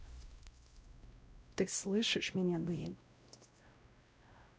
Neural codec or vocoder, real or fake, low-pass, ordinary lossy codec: codec, 16 kHz, 0.5 kbps, X-Codec, WavLM features, trained on Multilingual LibriSpeech; fake; none; none